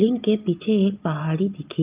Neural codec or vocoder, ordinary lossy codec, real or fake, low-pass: none; Opus, 24 kbps; real; 3.6 kHz